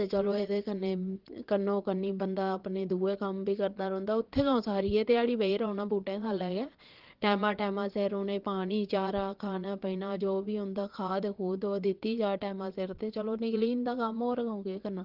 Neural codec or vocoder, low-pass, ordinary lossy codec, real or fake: vocoder, 22.05 kHz, 80 mel bands, Vocos; 5.4 kHz; Opus, 16 kbps; fake